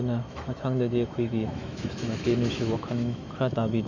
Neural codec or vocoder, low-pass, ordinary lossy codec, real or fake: autoencoder, 48 kHz, 128 numbers a frame, DAC-VAE, trained on Japanese speech; 7.2 kHz; none; fake